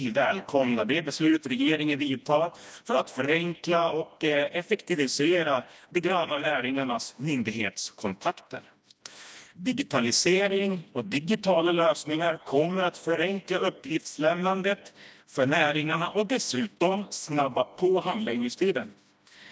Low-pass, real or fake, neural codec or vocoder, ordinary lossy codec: none; fake; codec, 16 kHz, 1 kbps, FreqCodec, smaller model; none